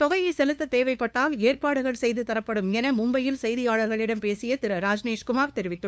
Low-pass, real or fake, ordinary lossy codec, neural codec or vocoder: none; fake; none; codec, 16 kHz, 2 kbps, FunCodec, trained on LibriTTS, 25 frames a second